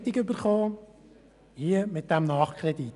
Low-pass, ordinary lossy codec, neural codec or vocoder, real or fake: 10.8 kHz; none; none; real